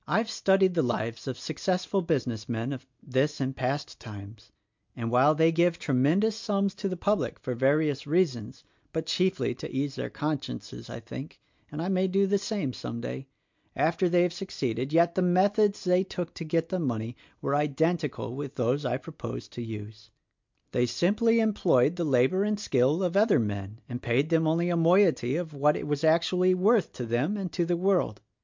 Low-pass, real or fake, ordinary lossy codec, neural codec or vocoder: 7.2 kHz; real; MP3, 64 kbps; none